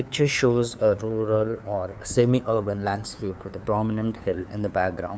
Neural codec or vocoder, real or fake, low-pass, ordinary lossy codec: codec, 16 kHz, 2 kbps, FunCodec, trained on LibriTTS, 25 frames a second; fake; none; none